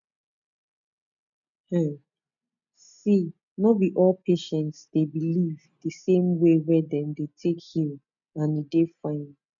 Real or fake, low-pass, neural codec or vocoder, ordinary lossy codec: real; 7.2 kHz; none; none